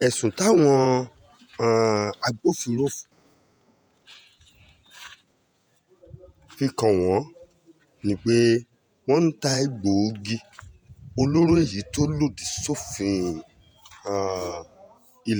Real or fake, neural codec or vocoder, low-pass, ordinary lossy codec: fake; vocoder, 48 kHz, 128 mel bands, Vocos; none; none